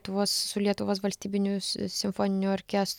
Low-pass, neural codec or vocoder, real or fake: 19.8 kHz; none; real